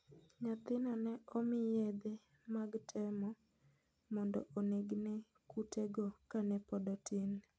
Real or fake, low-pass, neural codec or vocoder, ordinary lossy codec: real; none; none; none